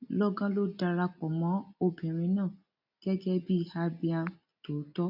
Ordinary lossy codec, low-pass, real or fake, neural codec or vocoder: none; 5.4 kHz; real; none